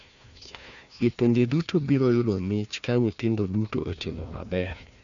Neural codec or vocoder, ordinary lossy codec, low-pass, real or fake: codec, 16 kHz, 1 kbps, FunCodec, trained on Chinese and English, 50 frames a second; none; 7.2 kHz; fake